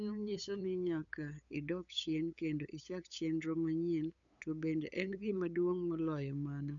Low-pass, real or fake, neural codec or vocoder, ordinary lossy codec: 7.2 kHz; fake; codec, 16 kHz, 8 kbps, FunCodec, trained on LibriTTS, 25 frames a second; none